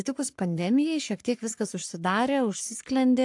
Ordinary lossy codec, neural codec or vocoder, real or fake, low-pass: AAC, 64 kbps; codec, 44.1 kHz, 3.4 kbps, Pupu-Codec; fake; 10.8 kHz